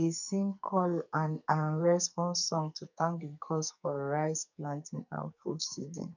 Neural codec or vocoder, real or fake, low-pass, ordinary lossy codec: codec, 44.1 kHz, 2.6 kbps, SNAC; fake; 7.2 kHz; none